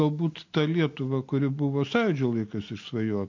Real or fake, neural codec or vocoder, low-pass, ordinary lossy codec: real; none; 7.2 kHz; MP3, 48 kbps